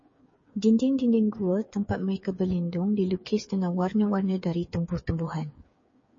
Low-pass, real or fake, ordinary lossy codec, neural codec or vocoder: 7.2 kHz; fake; MP3, 32 kbps; codec, 16 kHz, 8 kbps, FreqCodec, smaller model